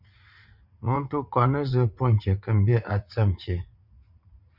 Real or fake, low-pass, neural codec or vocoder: fake; 5.4 kHz; vocoder, 44.1 kHz, 80 mel bands, Vocos